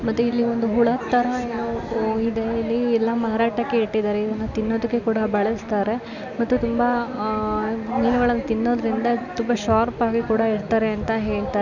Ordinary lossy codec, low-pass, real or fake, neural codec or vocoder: none; 7.2 kHz; real; none